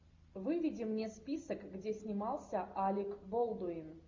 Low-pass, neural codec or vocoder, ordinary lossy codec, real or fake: 7.2 kHz; none; MP3, 48 kbps; real